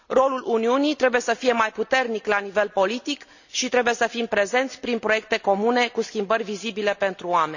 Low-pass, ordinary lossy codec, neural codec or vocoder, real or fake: 7.2 kHz; none; none; real